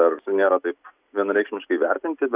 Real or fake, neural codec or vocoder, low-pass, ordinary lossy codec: real; none; 3.6 kHz; Opus, 64 kbps